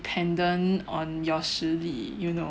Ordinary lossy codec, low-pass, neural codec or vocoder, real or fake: none; none; none; real